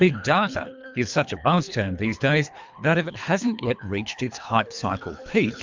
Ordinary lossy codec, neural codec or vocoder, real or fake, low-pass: MP3, 64 kbps; codec, 24 kHz, 3 kbps, HILCodec; fake; 7.2 kHz